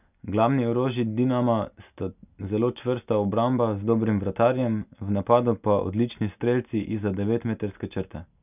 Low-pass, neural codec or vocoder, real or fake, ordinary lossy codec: 3.6 kHz; none; real; none